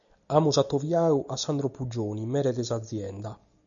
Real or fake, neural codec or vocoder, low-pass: real; none; 7.2 kHz